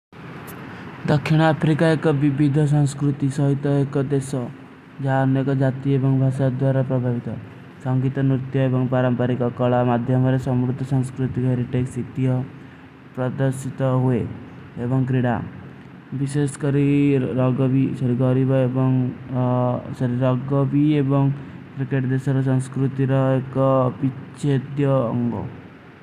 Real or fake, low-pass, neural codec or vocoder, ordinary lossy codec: real; 14.4 kHz; none; none